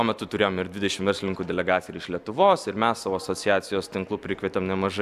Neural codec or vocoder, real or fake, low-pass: none; real; 14.4 kHz